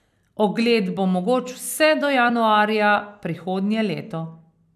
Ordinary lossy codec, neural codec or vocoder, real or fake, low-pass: none; vocoder, 44.1 kHz, 128 mel bands every 256 samples, BigVGAN v2; fake; 14.4 kHz